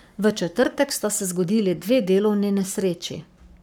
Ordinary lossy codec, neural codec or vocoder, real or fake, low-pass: none; codec, 44.1 kHz, 7.8 kbps, Pupu-Codec; fake; none